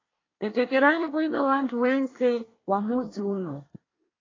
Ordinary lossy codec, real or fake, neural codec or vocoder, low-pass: AAC, 32 kbps; fake; codec, 24 kHz, 1 kbps, SNAC; 7.2 kHz